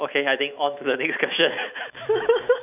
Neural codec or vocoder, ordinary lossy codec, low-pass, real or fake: autoencoder, 48 kHz, 128 numbers a frame, DAC-VAE, trained on Japanese speech; none; 3.6 kHz; fake